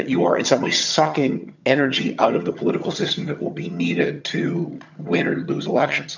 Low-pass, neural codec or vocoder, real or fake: 7.2 kHz; vocoder, 22.05 kHz, 80 mel bands, HiFi-GAN; fake